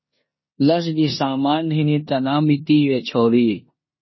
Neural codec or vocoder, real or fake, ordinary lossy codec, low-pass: codec, 16 kHz in and 24 kHz out, 0.9 kbps, LongCat-Audio-Codec, four codebook decoder; fake; MP3, 24 kbps; 7.2 kHz